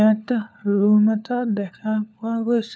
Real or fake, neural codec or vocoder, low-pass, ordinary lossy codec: fake; codec, 16 kHz, 4 kbps, FunCodec, trained on LibriTTS, 50 frames a second; none; none